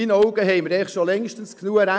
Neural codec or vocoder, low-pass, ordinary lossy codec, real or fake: none; none; none; real